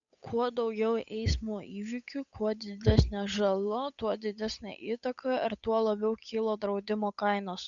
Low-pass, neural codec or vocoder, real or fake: 7.2 kHz; codec, 16 kHz, 8 kbps, FunCodec, trained on Chinese and English, 25 frames a second; fake